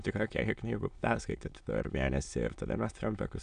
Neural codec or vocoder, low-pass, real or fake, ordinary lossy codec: autoencoder, 22.05 kHz, a latent of 192 numbers a frame, VITS, trained on many speakers; 9.9 kHz; fake; AAC, 96 kbps